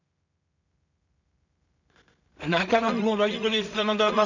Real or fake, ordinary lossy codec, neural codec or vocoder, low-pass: fake; none; codec, 16 kHz in and 24 kHz out, 0.4 kbps, LongCat-Audio-Codec, two codebook decoder; 7.2 kHz